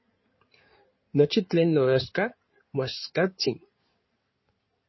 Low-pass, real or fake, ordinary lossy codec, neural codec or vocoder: 7.2 kHz; fake; MP3, 24 kbps; codec, 16 kHz in and 24 kHz out, 2.2 kbps, FireRedTTS-2 codec